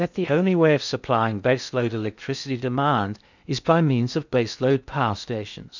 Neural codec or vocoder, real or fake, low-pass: codec, 16 kHz in and 24 kHz out, 0.6 kbps, FocalCodec, streaming, 2048 codes; fake; 7.2 kHz